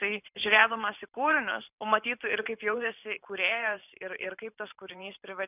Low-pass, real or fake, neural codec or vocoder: 3.6 kHz; fake; vocoder, 44.1 kHz, 128 mel bands every 512 samples, BigVGAN v2